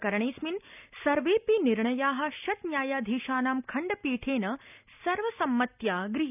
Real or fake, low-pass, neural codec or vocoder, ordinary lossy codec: real; 3.6 kHz; none; none